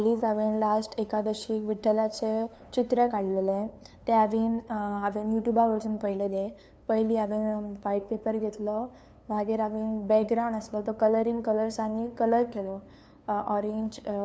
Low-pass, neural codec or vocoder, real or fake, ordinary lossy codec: none; codec, 16 kHz, 2 kbps, FunCodec, trained on LibriTTS, 25 frames a second; fake; none